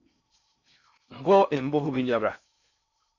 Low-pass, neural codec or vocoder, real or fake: 7.2 kHz; codec, 16 kHz in and 24 kHz out, 0.6 kbps, FocalCodec, streaming, 4096 codes; fake